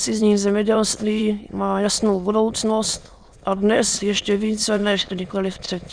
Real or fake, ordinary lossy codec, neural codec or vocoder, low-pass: fake; Opus, 64 kbps; autoencoder, 22.05 kHz, a latent of 192 numbers a frame, VITS, trained on many speakers; 9.9 kHz